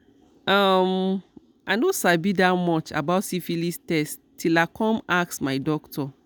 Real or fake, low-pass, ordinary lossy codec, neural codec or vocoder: real; none; none; none